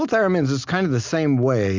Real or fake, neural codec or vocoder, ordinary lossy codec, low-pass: real; none; MP3, 64 kbps; 7.2 kHz